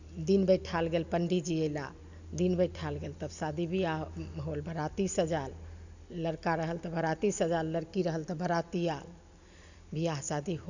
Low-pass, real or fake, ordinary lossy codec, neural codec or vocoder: 7.2 kHz; real; none; none